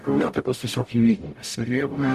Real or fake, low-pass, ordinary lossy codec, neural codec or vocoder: fake; 14.4 kHz; Opus, 64 kbps; codec, 44.1 kHz, 0.9 kbps, DAC